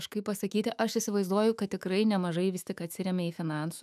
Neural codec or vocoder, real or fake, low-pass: autoencoder, 48 kHz, 128 numbers a frame, DAC-VAE, trained on Japanese speech; fake; 14.4 kHz